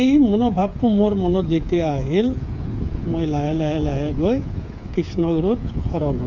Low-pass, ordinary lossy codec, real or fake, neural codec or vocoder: 7.2 kHz; none; fake; codec, 16 kHz, 8 kbps, FreqCodec, smaller model